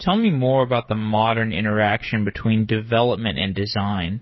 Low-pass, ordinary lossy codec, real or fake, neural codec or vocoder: 7.2 kHz; MP3, 24 kbps; fake; codec, 16 kHz, 8 kbps, FreqCodec, smaller model